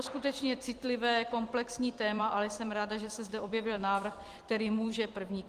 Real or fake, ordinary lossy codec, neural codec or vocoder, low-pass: fake; Opus, 24 kbps; vocoder, 44.1 kHz, 128 mel bands every 256 samples, BigVGAN v2; 14.4 kHz